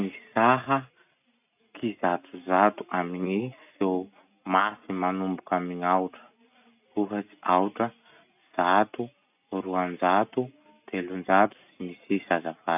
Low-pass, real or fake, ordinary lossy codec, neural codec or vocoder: 3.6 kHz; real; none; none